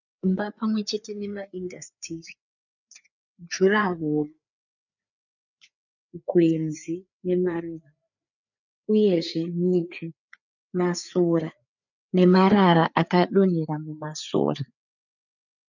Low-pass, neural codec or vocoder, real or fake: 7.2 kHz; codec, 16 kHz, 4 kbps, FreqCodec, larger model; fake